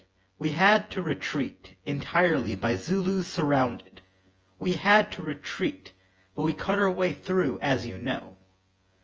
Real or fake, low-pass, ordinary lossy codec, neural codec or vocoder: fake; 7.2 kHz; Opus, 24 kbps; vocoder, 24 kHz, 100 mel bands, Vocos